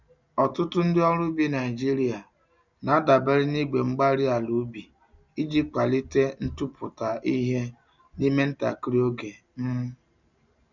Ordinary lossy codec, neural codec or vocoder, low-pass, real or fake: none; none; 7.2 kHz; real